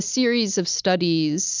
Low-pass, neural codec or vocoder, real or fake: 7.2 kHz; none; real